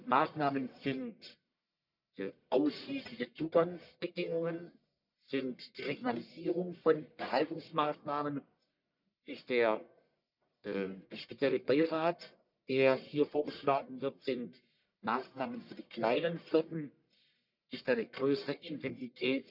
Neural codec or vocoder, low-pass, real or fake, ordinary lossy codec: codec, 44.1 kHz, 1.7 kbps, Pupu-Codec; 5.4 kHz; fake; none